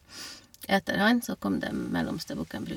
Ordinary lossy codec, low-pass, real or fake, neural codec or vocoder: none; 19.8 kHz; real; none